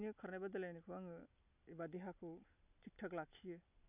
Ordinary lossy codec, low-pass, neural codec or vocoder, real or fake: none; 3.6 kHz; none; real